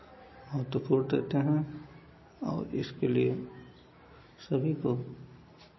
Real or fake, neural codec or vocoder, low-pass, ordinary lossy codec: real; none; 7.2 kHz; MP3, 24 kbps